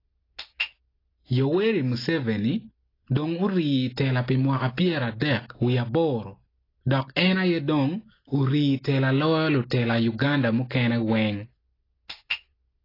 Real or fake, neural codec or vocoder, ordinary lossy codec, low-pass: real; none; AAC, 24 kbps; 5.4 kHz